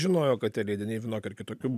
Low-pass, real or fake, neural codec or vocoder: 14.4 kHz; real; none